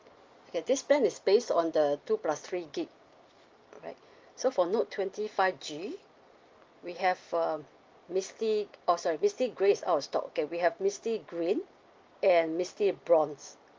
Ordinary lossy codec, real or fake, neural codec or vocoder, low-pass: Opus, 32 kbps; real; none; 7.2 kHz